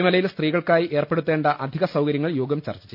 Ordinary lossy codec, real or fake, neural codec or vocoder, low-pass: none; real; none; 5.4 kHz